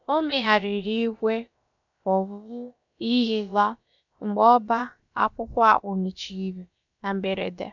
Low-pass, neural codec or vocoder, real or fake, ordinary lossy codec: 7.2 kHz; codec, 16 kHz, about 1 kbps, DyCAST, with the encoder's durations; fake; none